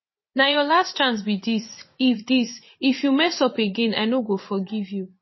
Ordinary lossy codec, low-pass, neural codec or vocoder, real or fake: MP3, 24 kbps; 7.2 kHz; vocoder, 24 kHz, 100 mel bands, Vocos; fake